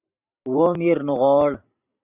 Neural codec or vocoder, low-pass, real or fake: vocoder, 44.1 kHz, 128 mel bands every 512 samples, BigVGAN v2; 3.6 kHz; fake